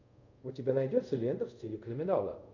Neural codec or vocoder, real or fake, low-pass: codec, 24 kHz, 0.5 kbps, DualCodec; fake; 7.2 kHz